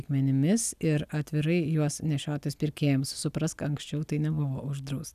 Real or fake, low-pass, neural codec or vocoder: real; 14.4 kHz; none